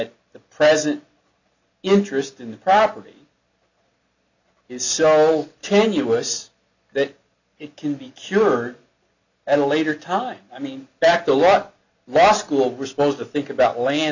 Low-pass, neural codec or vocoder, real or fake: 7.2 kHz; none; real